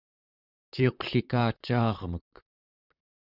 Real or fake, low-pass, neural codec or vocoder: fake; 5.4 kHz; vocoder, 44.1 kHz, 128 mel bands, Pupu-Vocoder